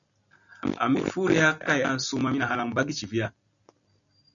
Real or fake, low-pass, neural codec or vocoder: real; 7.2 kHz; none